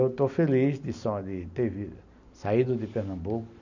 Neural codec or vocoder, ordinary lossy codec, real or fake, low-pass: none; none; real; 7.2 kHz